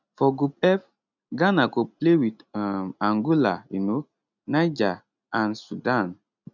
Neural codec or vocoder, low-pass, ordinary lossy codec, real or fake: none; 7.2 kHz; none; real